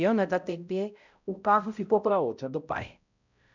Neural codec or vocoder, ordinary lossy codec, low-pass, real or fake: codec, 16 kHz, 0.5 kbps, X-Codec, HuBERT features, trained on balanced general audio; none; 7.2 kHz; fake